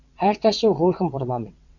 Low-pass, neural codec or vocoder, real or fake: 7.2 kHz; vocoder, 22.05 kHz, 80 mel bands, WaveNeXt; fake